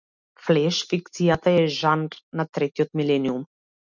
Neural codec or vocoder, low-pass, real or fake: none; 7.2 kHz; real